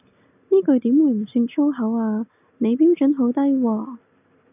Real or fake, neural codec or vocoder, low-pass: real; none; 3.6 kHz